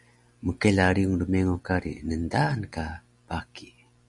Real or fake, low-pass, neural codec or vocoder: real; 10.8 kHz; none